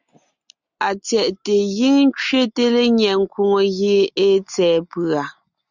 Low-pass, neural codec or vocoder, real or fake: 7.2 kHz; none; real